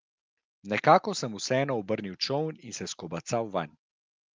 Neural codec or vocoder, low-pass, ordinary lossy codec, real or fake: none; 7.2 kHz; Opus, 24 kbps; real